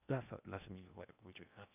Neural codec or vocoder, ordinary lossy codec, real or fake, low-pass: codec, 16 kHz in and 24 kHz out, 0.8 kbps, FocalCodec, streaming, 65536 codes; none; fake; 3.6 kHz